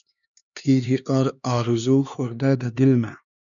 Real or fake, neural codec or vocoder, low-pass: fake; codec, 16 kHz, 2 kbps, X-Codec, HuBERT features, trained on LibriSpeech; 7.2 kHz